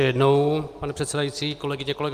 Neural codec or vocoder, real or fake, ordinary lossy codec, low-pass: none; real; Opus, 32 kbps; 14.4 kHz